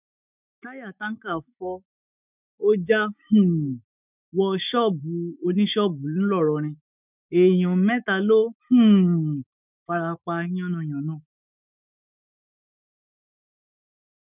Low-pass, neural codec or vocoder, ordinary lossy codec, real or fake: 3.6 kHz; autoencoder, 48 kHz, 128 numbers a frame, DAC-VAE, trained on Japanese speech; none; fake